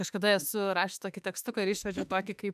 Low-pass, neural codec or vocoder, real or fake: 14.4 kHz; autoencoder, 48 kHz, 32 numbers a frame, DAC-VAE, trained on Japanese speech; fake